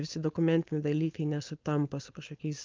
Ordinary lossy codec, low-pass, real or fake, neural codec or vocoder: Opus, 24 kbps; 7.2 kHz; fake; codec, 16 kHz, 4.8 kbps, FACodec